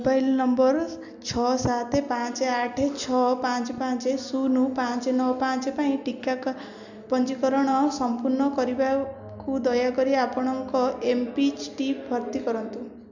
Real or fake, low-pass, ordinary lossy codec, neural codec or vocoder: real; 7.2 kHz; none; none